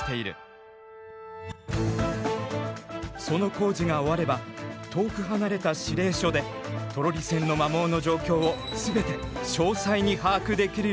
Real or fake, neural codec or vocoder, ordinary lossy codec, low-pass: real; none; none; none